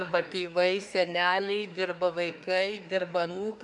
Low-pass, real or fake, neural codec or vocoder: 10.8 kHz; fake; codec, 24 kHz, 1 kbps, SNAC